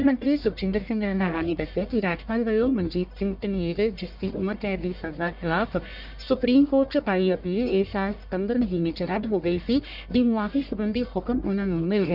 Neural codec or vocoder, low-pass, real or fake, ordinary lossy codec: codec, 44.1 kHz, 1.7 kbps, Pupu-Codec; 5.4 kHz; fake; none